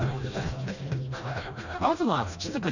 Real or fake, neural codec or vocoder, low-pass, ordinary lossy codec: fake; codec, 16 kHz, 1 kbps, FreqCodec, smaller model; 7.2 kHz; none